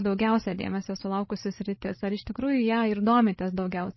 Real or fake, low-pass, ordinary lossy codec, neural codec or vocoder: fake; 7.2 kHz; MP3, 24 kbps; codec, 16 kHz, 16 kbps, FreqCodec, larger model